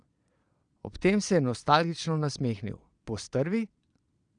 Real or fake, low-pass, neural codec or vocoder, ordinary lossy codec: fake; 9.9 kHz; vocoder, 22.05 kHz, 80 mel bands, Vocos; none